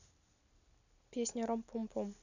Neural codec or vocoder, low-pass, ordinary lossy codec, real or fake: none; 7.2 kHz; none; real